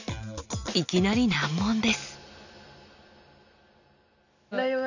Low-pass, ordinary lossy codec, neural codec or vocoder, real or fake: 7.2 kHz; none; none; real